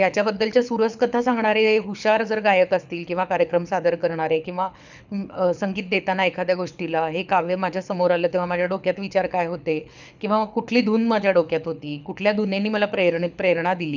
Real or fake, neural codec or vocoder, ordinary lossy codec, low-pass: fake; codec, 24 kHz, 6 kbps, HILCodec; none; 7.2 kHz